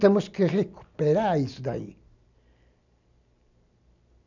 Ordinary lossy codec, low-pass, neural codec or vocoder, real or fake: none; 7.2 kHz; none; real